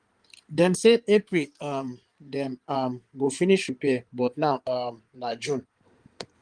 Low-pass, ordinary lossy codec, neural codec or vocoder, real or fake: 9.9 kHz; Opus, 24 kbps; codec, 16 kHz in and 24 kHz out, 2.2 kbps, FireRedTTS-2 codec; fake